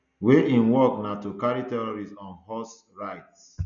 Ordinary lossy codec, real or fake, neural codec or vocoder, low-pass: none; real; none; 7.2 kHz